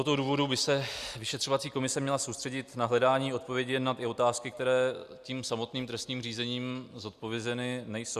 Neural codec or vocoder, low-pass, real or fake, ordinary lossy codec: none; 14.4 kHz; real; Opus, 64 kbps